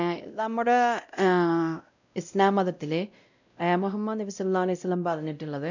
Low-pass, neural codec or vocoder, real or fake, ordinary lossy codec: 7.2 kHz; codec, 16 kHz, 0.5 kbps, X-Codec, WavLM features, trained on Multilingual LibriSpeech; fake; none